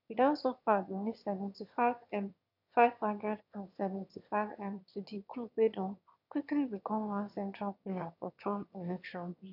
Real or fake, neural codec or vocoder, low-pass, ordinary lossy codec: fake; autoencoder, 22.05 kHz, a latent of 192 numbers a frame, VITS, trained on one speaker; 5.4 kHz; none